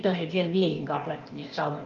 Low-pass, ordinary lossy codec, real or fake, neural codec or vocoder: 7.2 kHz; Opus, 32 kbps; fake; codec, 16 kHz, 0.8 kbps, ZipCodec